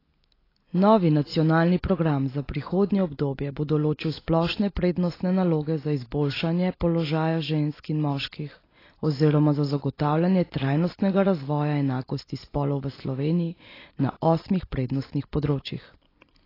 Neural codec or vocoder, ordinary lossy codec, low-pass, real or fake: none; AAC, 24 kbps; 5.4 kHz; real